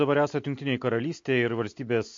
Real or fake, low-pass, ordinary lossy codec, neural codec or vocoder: real; 7.2 kHz; MP3, 48 kbps; none